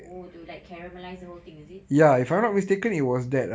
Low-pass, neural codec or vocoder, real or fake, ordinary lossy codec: none; none; real; none